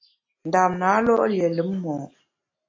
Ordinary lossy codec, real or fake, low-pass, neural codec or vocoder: AAC, 32 kbps; real; 7.2 kHz; none